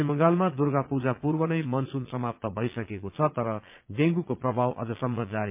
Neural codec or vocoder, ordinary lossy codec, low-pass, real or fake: vocoder, 22.05 kHz, 80 mel bands, Vocos; MP3, 32 kbps; 3.6 kHz; fake